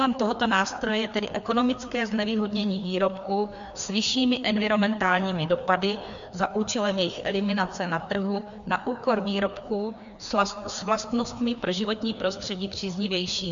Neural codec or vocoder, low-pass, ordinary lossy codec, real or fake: codec, 16 kHz, 2 kbps, FreqCodec, larger model; 7.2 kHz; MP3, 64 kbps; fake